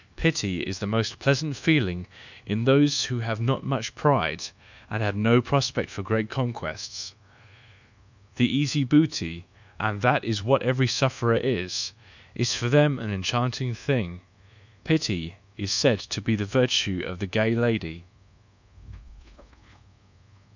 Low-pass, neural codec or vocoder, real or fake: 7.2 kHz; codec, 24 kHz, 1.2 kbps, DualCodec; fake